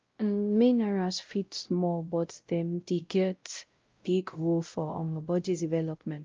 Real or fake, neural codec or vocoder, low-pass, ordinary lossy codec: fake; codec, 16 kHz, 0.5 kbps, X-Codec, WavLM features, trained on Multilingual LibriSpeech; 7.2 kHz; Opus, 24 kbps